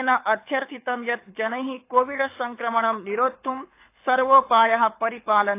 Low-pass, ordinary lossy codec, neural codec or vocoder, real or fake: 3.6 kHz; AAC, 24 kbps; codec, 24 kHz, 6 kbps, HILCodec; fake